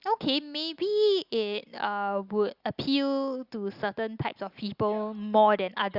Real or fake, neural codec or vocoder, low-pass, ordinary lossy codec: real; none; 5.4 kHz; Opus, 64 kbps